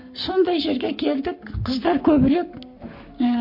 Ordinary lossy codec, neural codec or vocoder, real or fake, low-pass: MP3, 32 kbps; codec, 44.1 kHz, 7.8 kbps, Pupu-Codec; fake; 5.4 kHz